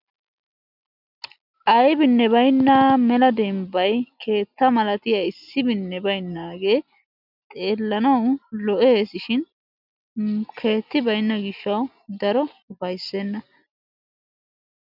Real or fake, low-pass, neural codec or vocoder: real; 5.4 kHz; none